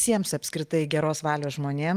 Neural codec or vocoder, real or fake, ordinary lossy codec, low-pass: none; real; Opus, 32 kbps; 14.4 kHz